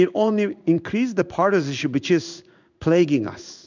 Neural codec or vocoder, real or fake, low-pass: codec, 16 kHz in and 24 kHz out, 1 kbps, XY-Tokenizer; fake; 7.2 kHz